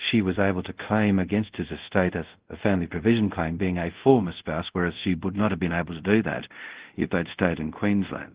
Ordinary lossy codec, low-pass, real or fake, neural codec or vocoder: Opus, 16 kbps; 3.6 kHz; fake; codec, 24 kHz, 0.5 kbps, DualCodec